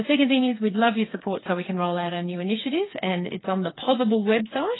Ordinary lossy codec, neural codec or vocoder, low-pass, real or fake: AAC, 16 kbps; codec, 16 kHz, 4 kbps, FreqCodec, smaller model; 7.2 kHz; fake